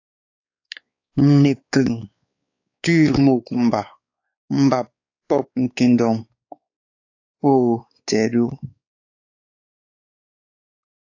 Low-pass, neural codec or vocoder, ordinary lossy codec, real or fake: 7.2 kHz; codec, 16 kHz, 4 kbps, X-Codec, WavLM features, trained on Multilingual LibriSpeech; AAC, 48 kbps; fake